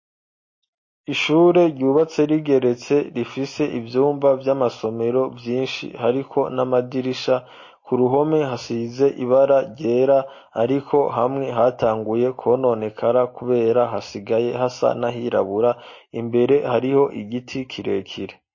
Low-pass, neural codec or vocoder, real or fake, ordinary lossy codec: 7.2 kHz; none; real; MP3, 32 kbps